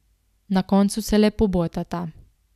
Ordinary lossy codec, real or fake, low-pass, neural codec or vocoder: none; real; 14.4 kHz; none